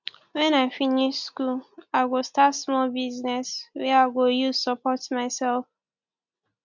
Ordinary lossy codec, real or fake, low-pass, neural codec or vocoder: MP3, 64 kbps; real; 7.2 kHz; none